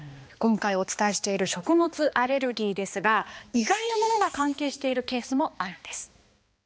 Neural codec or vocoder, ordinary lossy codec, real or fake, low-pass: codec, 16 kHz, 2 kbps, X-Codec, HuBERT features, trained on balanced general audio; none; fake; none